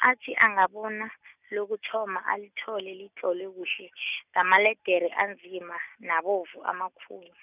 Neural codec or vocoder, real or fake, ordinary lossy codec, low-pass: none; real; none; 3.6 kHz